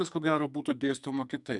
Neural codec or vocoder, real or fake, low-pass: codec, 44.1 kHz, 2.6 kbps, SNAC; fake; 10.8 kHz